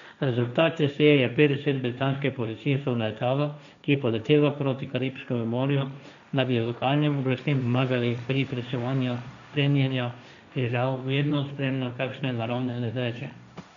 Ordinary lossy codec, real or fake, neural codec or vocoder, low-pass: none; fake; codec, 16 kHz, 1.1 kbps, Voila-Tokenizer; 7.2 kHz